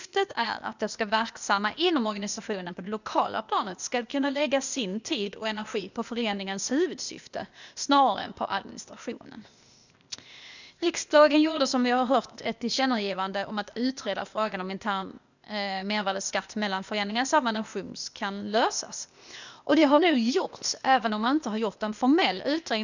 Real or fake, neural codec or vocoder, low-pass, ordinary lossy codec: fake; codec, 16 kHz, 0.8 kbps, ZipCodec; 7.2 kHz; none